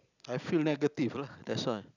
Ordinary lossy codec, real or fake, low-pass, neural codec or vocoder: none; real; 7.2 kHz; none